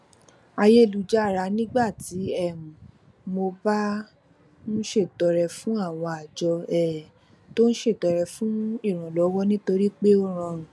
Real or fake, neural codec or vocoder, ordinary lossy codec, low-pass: real; none; none; none